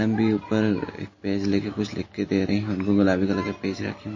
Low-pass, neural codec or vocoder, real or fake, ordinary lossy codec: 7.2 kHz; none; real; MP3, 32 kbps